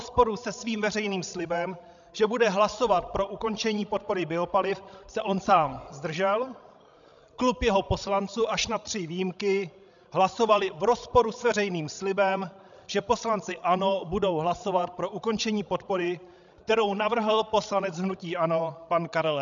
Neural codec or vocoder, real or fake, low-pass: codec, 16 kHz, 16 kbps, FreqCodec, larger model; fake; 7.2 kHz